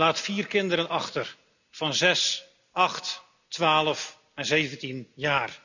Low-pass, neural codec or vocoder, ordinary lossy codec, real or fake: 7.2 kHz; none; none; real